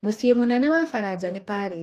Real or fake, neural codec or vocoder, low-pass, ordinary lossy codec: fake; codec, 44.1 kHz, 2.6 kbps, DAC; 14.4 kHz; AAC, 64 kbps